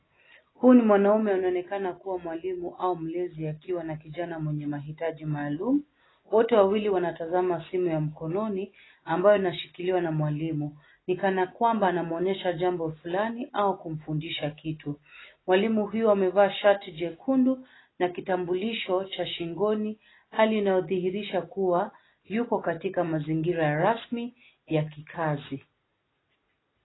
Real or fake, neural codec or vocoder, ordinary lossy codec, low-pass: real; none; AAC, 16 kbps; 7.2 kHz